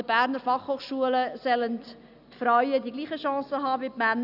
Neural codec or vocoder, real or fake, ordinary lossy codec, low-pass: none; real; none; 5.4 kHz